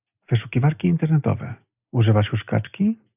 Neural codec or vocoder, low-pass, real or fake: none; 3.6 kHz; real